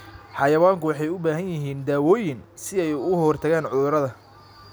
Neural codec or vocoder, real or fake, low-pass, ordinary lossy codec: none; real; none; none